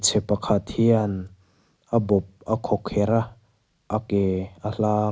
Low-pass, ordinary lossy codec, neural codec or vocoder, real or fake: none; none; none; real